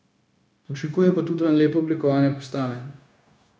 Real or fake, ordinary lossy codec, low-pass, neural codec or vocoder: fake; none; none; codec, 16 kHz, 0.9 kbps, LongCat-Audio-Codec